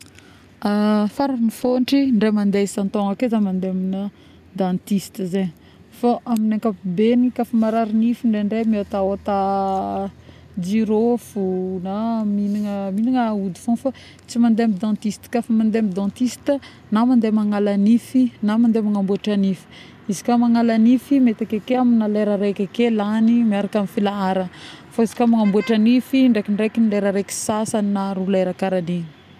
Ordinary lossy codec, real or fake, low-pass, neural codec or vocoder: none; real; 14.4 kHz; none